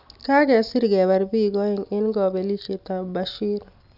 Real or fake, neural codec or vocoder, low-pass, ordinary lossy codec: real; none; 5.4 kHz; none